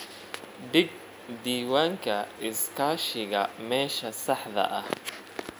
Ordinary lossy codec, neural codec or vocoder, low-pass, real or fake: none; none; none; real